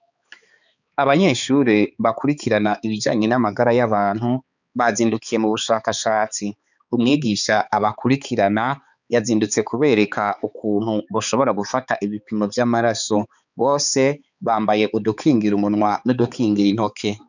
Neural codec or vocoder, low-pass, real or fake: codec, 16 kHz, 4 kbps, X-Codec, HuBERT features, trained on balanced general audio; 7.2 kHz; fake